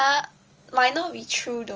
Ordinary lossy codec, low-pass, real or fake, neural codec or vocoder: Opus, 24 kbps; 7.2 kHz; real; none